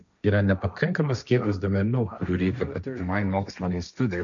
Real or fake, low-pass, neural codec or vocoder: fake; 7.2 kHz; codec, 16 kHz, 1.1 kbps, Voila-Tokenizer